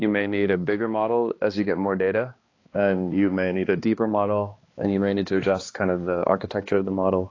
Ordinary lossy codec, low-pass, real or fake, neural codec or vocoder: AAC, 32 kbps; 7.2 kHz; fake; codec, 16 kHz, 2 kbps, X-Codec, HuBERT features, trained on balanced general audio